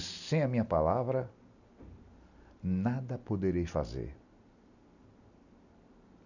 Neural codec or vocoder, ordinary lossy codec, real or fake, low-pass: none; none; real; 7.2 kHz